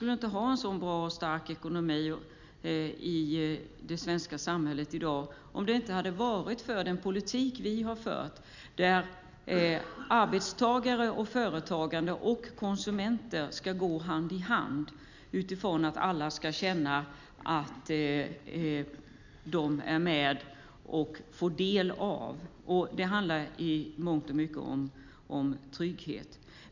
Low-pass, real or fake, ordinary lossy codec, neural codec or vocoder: 7.2 kHz; real; none; none